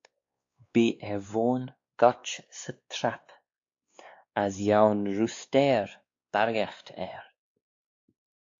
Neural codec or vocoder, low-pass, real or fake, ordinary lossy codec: codec, 16 kHz, 2 kbps, X-Codec, WavLM features, trained on Multilingual LibriSpeech; 7.2 kHz; fake; AAC, 48 kbps